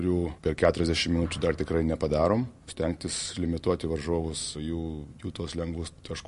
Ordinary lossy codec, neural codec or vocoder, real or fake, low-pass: MP3, 48 kbps; none; real; 14.4 kHz